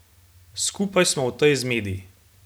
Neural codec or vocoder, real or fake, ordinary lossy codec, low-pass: none; real; none; none